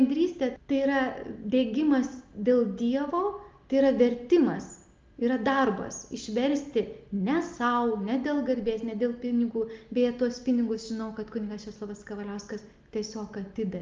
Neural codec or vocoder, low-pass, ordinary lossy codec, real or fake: none; 7.2 kHz; Opus, 32 kbps; real